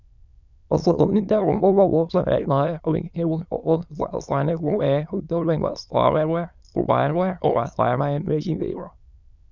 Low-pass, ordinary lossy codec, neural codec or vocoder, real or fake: 7.2 kHz; none; autoencoder, 22.05 kHz, a latent of 192 numbers a frame, VITS, trained on many speakers; fake